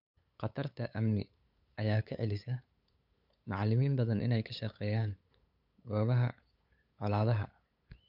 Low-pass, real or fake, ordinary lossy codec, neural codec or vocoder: 5.4 kHz; fake; none; codec, 16 kHz, 8 kbps, FunCodec, trained on LibriTTS, 25 frames a second